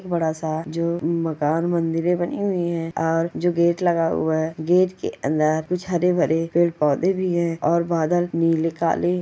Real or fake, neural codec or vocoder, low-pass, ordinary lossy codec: real; none; none; none